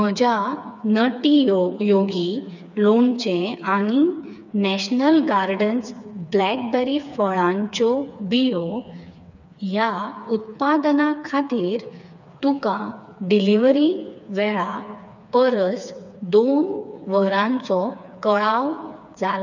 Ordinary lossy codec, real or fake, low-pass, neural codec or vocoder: none; fake; 7.2 kHz; codec, 16 kHz, 4 kbps, FreqCodec, smaller model